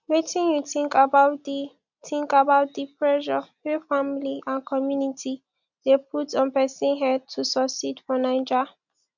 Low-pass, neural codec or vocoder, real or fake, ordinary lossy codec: 7.2 kHz; none; real; none